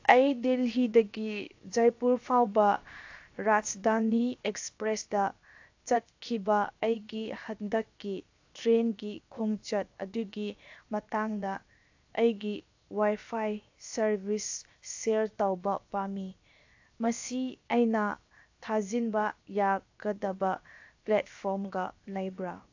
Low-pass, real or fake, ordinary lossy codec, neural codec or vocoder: 7.2 kHz; fake; AAC, 48 kbps; codec, 16 kHz, 0.7 kbps, FocalCodec